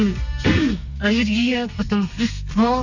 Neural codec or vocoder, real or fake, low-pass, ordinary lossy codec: codec, 32 kHz, 1.9 kbps, SNAC; fake; 7.2 kHz; none